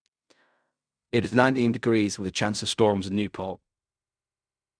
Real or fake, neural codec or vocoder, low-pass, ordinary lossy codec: fake; codec, 16 kHz in and 24 kHz out, 0.4 kbps, LongCat-Audio-Codec, fine tuned four codebook decoder; 9.9 kHz; none